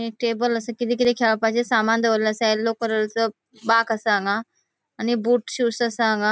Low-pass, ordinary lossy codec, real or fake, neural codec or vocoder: none; none; real; none